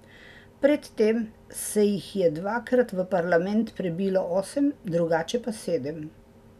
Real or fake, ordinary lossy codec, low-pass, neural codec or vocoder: real; none; 14.4 kHz; none